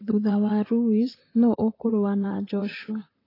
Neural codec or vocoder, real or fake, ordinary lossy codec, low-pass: vocoder, 44.1 kHz, 128 mel bands, Pupu-Vocoder; fake; AAC, 24 kbps; 5.4 kHz